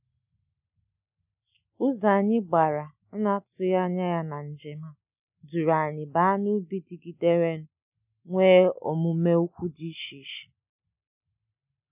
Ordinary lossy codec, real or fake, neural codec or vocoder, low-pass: none; real; none; 3.6 kHz